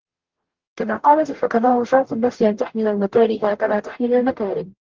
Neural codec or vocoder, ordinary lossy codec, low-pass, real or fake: codec, 44.1 kHz, 0.9 kbps, DAC; Opus, 16 kbps; 7.2 kHz; fake